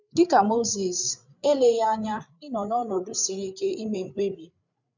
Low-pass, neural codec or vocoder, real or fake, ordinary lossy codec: 7.2 kHz; codec, 16 kHz, 8 kbps, FreqCodec, larger model; fake; none